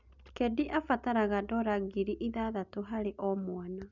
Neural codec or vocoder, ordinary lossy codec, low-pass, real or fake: none; none; 7.2 kHz; real